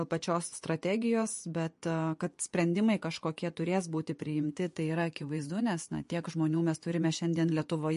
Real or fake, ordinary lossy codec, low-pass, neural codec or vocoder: fake; MP3, 48 kbps; 10.8 kHz; vocoder, 24 kHz, 100 mel bands, Vocos